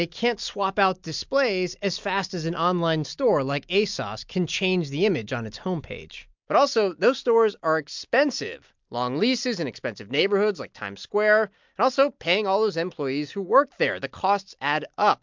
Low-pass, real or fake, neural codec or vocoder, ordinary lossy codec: 7.2 kHz; real; none; MP3, 64 kbps